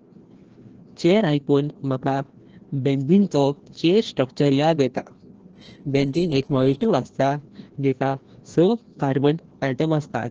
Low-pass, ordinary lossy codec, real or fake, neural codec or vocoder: 7.2 kHz; Opus, 16 kbps; fake; codec, 16 kHz, 1 kbps, FreqCodec, larger model